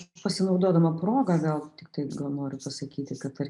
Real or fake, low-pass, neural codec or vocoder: real; 10.8 kHz; none